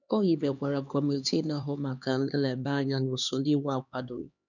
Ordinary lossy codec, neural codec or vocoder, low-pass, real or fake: none; codec, 16 kHz, 2 kbps, X-Codec, HuBERT features, trained on LibriSpeech; 7.2 kHz; fake